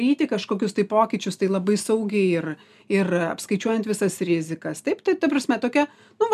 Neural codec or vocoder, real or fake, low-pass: none; real; 14.4 kHz